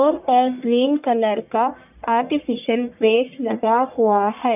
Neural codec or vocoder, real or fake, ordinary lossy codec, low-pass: codec, 44.1 kHz, 1.7 kbps, Pupu-Codec; fake; none; 3.6 kHz